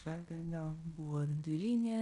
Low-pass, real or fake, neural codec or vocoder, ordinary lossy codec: 10.8 kHz; fake; codec, 16 kHz in and 24 kHz out, 0.9 kbps, LongCat-Audio-Codec, fine tuned four codebook decoder; AAC, 96 kbps